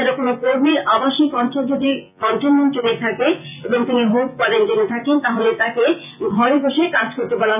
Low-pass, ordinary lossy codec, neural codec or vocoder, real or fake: 3.6 kHz; none; vocoder, 24 kHz, 100 mel bands, Vocos; fake